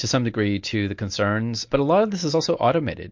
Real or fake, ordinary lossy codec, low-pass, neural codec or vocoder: real; MP3, 48 kbps; 7.2 kHz; none